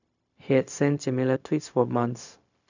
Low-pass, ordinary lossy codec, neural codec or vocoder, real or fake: 7.2 kHz; none; codec, 16 kHz, 0.4 kbps, LongCat-Audio-Codec; fake